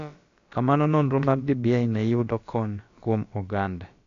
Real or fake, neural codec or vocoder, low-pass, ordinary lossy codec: fake; codec, 16 kHz, about 1 kbps, DyCAST, with the encoder's durations; 7.2 kHz; AAC, 48 kbps